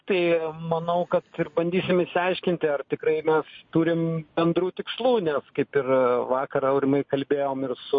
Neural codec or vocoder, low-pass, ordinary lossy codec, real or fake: none; 7.2 kHz; MP3, 32 kbps; real